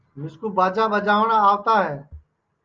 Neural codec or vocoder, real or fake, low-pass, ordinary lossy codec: none; real; 7.2 kHz; Opus, 24 kbps